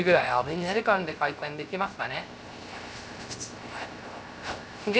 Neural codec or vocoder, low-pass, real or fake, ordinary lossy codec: codec, 16 kHz, 0.3 kbps, FocalCodec; none; fake; none